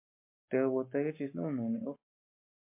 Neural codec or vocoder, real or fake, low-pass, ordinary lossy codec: codec, 44.1 kHz, 7.8 kbps, Pupu-Codec; fake; 3.6 kHz; MP3, 32 kbps